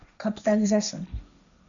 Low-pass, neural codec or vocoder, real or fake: 7.2 kHz; codec, 16 kHz, 1.1 kbps, Voila-Tokenizer; fake